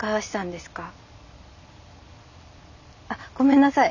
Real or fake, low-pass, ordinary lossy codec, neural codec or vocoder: real; 7.2 kHz; none; none